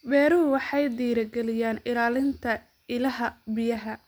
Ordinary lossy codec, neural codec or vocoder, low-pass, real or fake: none; none; none; real